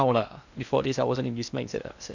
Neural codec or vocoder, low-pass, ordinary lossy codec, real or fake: codec, 16 kHz in and 24 kHz out, 0.6 kbps, FocalCodec, streaming, 2048 codes; 7.2 kHz; none; fake